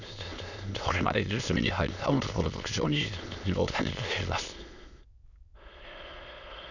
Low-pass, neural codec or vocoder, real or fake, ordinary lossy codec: 7.2 kHz; autoencoder, 22.05 kHz, a latent of 192 numbers a frame, VITS, trained on many speakers; fake; none